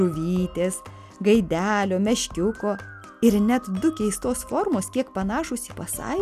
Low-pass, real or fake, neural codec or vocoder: 14.4 kHz; real; none